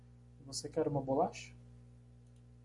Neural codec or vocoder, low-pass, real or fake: none; 10.8 kHz; real